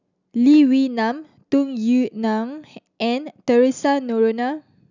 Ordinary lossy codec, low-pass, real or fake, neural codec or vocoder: none; 7.2 kHz; real; none